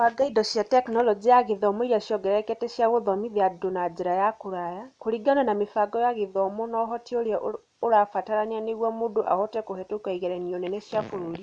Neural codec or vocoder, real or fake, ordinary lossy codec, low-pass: none; real; none; 9.9 kHz